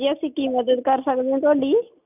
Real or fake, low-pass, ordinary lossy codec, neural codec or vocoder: real; 3.6 kHz; none; none